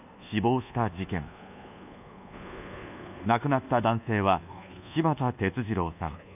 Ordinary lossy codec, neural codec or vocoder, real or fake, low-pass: none; codec, 24 kHz, 1.2 kbps, DualCodec; fake; 3.6 kHz